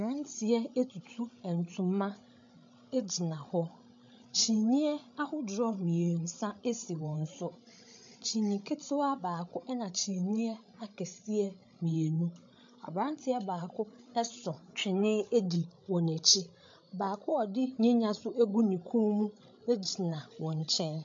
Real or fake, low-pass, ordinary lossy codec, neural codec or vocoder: fake; 7.2 kHz; MP3, 48 kbps; codec, 16 kHz, 16 kbps, FreqCodec, larger model